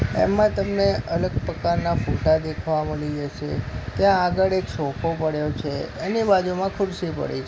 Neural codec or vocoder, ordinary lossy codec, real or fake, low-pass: none; none; real; none